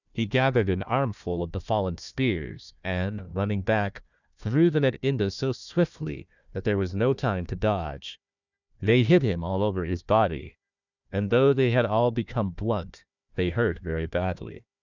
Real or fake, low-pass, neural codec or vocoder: fake; 7.2 kHz; codec, 16 kHz, 1 kbps, FunCodec, trained on Chinese and English, 50 frames a second